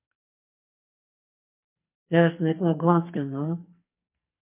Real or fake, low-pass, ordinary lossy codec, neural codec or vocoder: fake; 3.6 kHz; MP3, 24 kbps; codec, 44.1 kHz, 2.6 kbps, SNAC